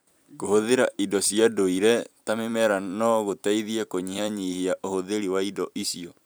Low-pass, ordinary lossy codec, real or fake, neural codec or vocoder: none; none; fake; vocoder, 44.1 kHz, 128 mel bands every 256 samples, BigVGAN v2